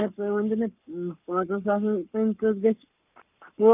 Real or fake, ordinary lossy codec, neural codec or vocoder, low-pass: fake; none; codec, 44.1 kHz, 7.8 kbps, Pupu-Codec; 3.6 kHz